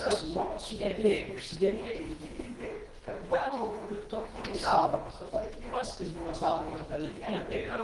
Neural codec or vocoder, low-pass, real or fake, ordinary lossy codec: codec, 24 kHz, 1.5 kbps, HILCodec; 10.8 kHz; fake; Opus, 24 kbps